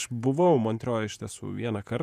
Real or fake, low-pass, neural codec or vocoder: fake; 14.4 kHz; vocoder, 48 kHz, 128 mel bands, Vocos